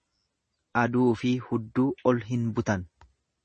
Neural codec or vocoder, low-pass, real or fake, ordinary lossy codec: none; 10.8 kHz; real; MP3, 32 kbps